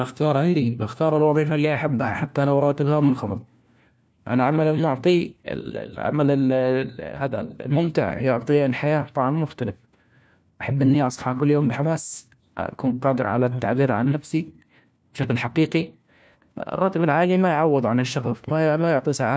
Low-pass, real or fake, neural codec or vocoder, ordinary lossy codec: none; fake; codec, 16 kHz, 1 kbps, FunCodec, trained on LibriTTS, 50 frames a second; none